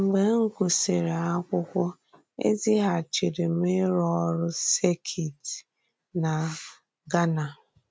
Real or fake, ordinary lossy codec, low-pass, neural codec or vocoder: real; none; none; none